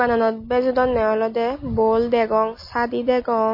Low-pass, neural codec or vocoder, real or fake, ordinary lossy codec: 5.4 kHz; none; real; MP3, 24 kbps